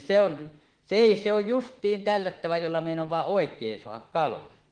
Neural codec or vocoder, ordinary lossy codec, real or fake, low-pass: autoencoder, 48 kHz, 32 numbers a frame, DAC-VAE, trained on Japanese speech; Opus, 16 kbps; fake; 9.9 kHz